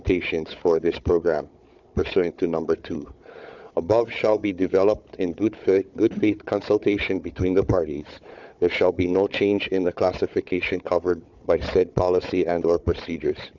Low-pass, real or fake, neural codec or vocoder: 7.2 kHz; fake; codec, 16 kHz, 4 kbps, FunCodec, trained on Chinese and English, 50 frames a second